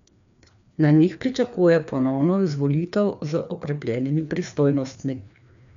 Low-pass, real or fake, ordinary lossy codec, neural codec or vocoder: 7.2 kHz; fake; none; codec, 16 kHz, 2 kbps, FreqCodec, larger model